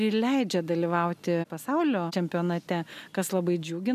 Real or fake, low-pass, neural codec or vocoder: real; 14.4 kHz; none